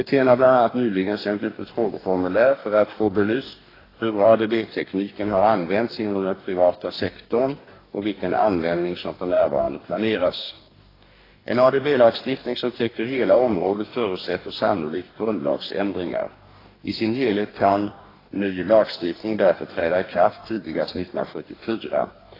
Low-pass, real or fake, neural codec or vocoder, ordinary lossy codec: 5.4 kHz; fake; codec, 44.1 kHz, 2.6 kbps, DAC; AAC, 24 kbps